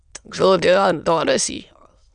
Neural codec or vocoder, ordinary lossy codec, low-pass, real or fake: autoencoder, 22.05 kHz, a latent of 192 numbers a frame, VITS, trained on many speakers; Opus, 64 kbps; 9.9 kHz; fake